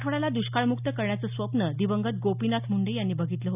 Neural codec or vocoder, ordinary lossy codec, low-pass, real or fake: none; none; 3.6 kHz; real